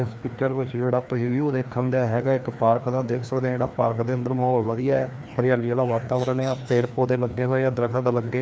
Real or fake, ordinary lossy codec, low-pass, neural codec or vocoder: fake; none; none; codec, 16 kHz, 2 kbps, FreqCodec, larger model